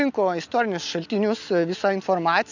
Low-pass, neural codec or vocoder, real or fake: 7.2 kHz; none; real